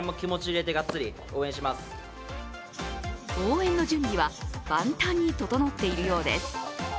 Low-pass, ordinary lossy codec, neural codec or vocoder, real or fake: none; none; none; real